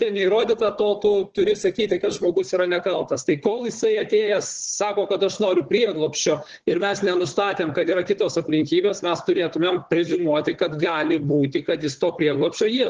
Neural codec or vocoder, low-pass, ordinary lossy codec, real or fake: codec, 16 kHz, 4 kbps, FunCodec, trained on Chinese and English, 50 frames a second; 7.2 kHz; Opus, 16 kbps; fake